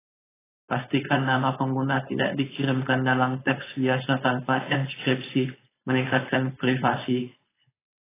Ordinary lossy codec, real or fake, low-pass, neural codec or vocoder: AAC, 16 kbps; fake; 3.6 kHz; codec, 16 kHz, 4.8 kbps, FACodec